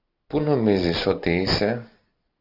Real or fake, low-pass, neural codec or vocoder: real; 5.4 kHz; none